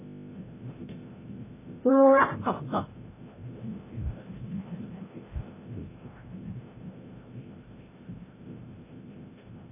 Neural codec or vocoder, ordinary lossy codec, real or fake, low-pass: codec, 16 kHz, 0.5 kbps, FreqCodec, larger model; AAC, 16 kbps; fake; 3.6 kHz